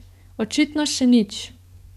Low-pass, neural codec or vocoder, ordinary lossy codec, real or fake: 14.4 kHz; codec, 44.1 kHz, 7.8 kbps, DAC; none; fake